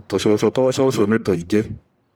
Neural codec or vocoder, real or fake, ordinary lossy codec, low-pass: codec, 44.1 kHz, 1.7 kbps, Pupu-Codec; fake; none; none